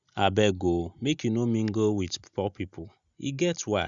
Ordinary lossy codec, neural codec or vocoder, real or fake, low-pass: Opus, 64 kbps; none; real; 7.2 kHz